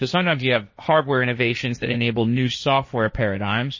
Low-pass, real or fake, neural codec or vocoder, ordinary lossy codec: 7.2 kHz; fake; codec, 16 kHz, 1.1 kbps, Voila-Tokenizer; MP3, 32 kbps